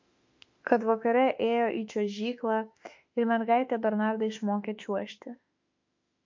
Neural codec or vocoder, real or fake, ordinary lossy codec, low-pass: autoencoder, 48 kHz, 32 numbers a frame, DAC-VAE, trained on Japanese speech; fake; MP3, 48 kbps; 7.2 kHz